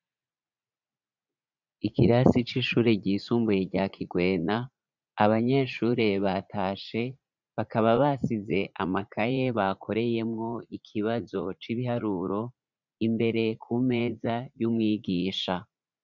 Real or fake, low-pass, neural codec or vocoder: fake; 7.2 kHz; vocoder, 44.1 kHz, 80 mel bands, Vocos